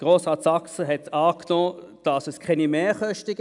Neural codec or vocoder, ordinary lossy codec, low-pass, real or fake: none; none; 10.8 kHz; real